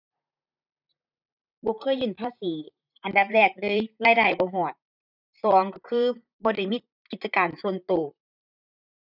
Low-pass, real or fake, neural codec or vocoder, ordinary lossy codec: 5.4 kHz; fake; vocoder, 44.1 kHz, 128 mel bands, Pupu-Vocoder; none